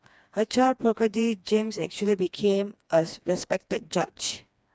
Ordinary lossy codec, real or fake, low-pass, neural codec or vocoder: none; fake; none; codec, 16 kHz, 2 kbps, FreqCodec, smaller model